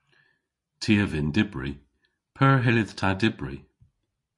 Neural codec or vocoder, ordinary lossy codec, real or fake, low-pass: none; MP3, 48 kbps; real; 10.8 kHz